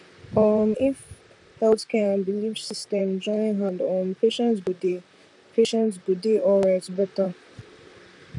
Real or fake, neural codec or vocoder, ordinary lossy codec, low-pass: fake; vocoder, 44.1 kHz, 128 mel bands, Pupu-Vocoder; none; 10.8 kHz